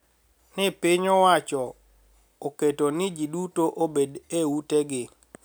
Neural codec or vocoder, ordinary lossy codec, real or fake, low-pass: none; none; real; none